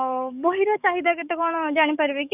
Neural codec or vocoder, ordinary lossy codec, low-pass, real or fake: codec, 44.1 kHz, 7.8 kbps, DAC; none; 3.6 kHz; fake